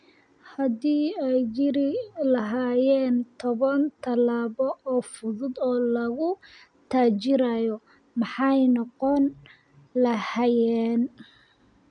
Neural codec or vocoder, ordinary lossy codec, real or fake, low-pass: none; none; real; 9.9 kHz